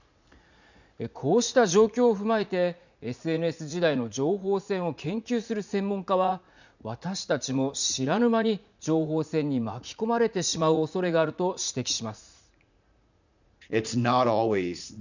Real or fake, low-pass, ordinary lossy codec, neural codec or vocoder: fake; 7.2 kHz; none; vocoder, 44.1 kHz, 80 mel bands, Vocos